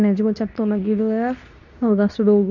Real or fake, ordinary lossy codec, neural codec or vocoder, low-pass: fake; none; codec, 24 kHz, 0.9 kbps, WavTokenizer, medium speech release version 2; 7.2 kHz